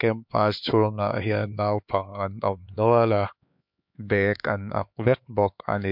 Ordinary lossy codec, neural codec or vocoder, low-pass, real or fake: MP3, 48 kbps; codec, 16 kHz, 4 kbps, X-Codec, HuBERT features, trained on LibriSpeech; 5.4 kHz; fake